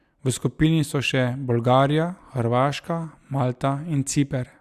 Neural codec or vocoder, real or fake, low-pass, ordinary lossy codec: none; real; 14.4 kHz; Opus, 64 kbps